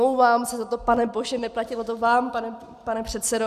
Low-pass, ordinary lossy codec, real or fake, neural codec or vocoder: 14.4 kHz; Opus, 64 kbps; real; none